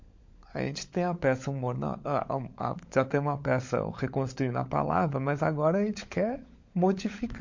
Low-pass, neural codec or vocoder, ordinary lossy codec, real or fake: 7.2 kHz; codec, 16 kHz, 16 kbps, FunCodec, trained on LibriTTS, 50 frames a second; MP3, 48 kbps; fake